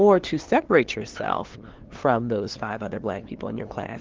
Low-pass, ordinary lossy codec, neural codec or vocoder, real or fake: 7.2 kHz; Opus, 16 kbps; codec, 16 kHz, 2 kbps, FunCodec, trained on LibriTTS, 25 frames a second; fake